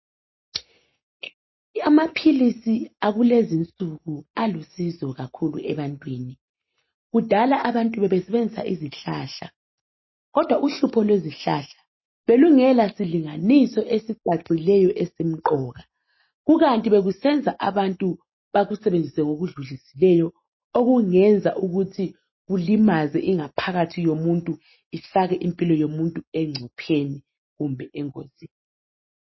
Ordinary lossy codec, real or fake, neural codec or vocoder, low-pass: MP3, 24 kbps; real; none; 7.2 kHz